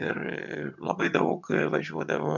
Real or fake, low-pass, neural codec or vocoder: fake; 7.2 kHz; vocoder, 22.05 kHz, 80 mel bands, HiFi-GAN